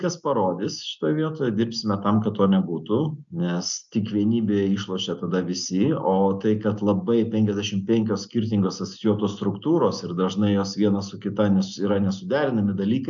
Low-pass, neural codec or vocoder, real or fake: 7.2 kHz; none; real